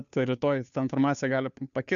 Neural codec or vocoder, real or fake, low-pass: codec, 16 kHz, 2 kbps, FunCodec, trained on Chinese and English, 25 frames a second; fake; 7.2 kHz